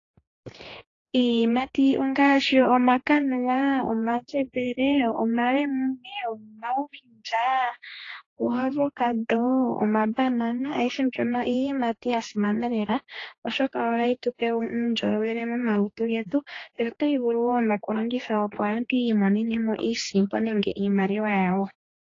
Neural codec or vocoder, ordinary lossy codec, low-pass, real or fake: codec, 16 kHz, 2 kbps, X-Codec, HuBERT features, trained on general audio; AAC, 32 kbps; 7.2 kHz; fake